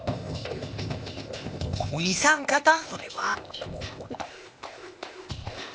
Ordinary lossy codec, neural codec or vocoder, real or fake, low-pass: none; codec, 16 kHz, 0.8 kbps, ZipCodec; fake; none